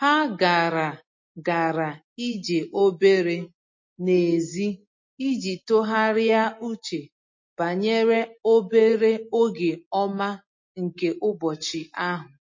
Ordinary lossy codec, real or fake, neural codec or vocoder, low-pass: MP3, 32 kbps; real; none; 7.2 kHz